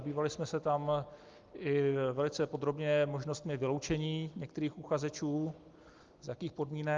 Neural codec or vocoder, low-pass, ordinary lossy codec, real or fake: none; 7.2 kHz; Opus, 32 kbps; real